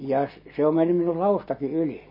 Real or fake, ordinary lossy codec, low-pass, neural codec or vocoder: real; MP3, 24 kbps; 5.4 kHz; none